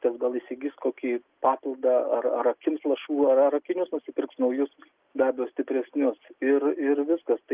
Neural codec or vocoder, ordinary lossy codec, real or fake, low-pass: none; Opus, 32 kbps; real; 3.6 kHz